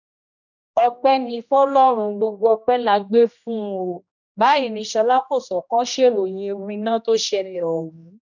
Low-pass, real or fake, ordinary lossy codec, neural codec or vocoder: 7.2 kHz; fake; none; codec, 16 kHz, 1 kbps, X-Codec, HuBERT features, trained on general audio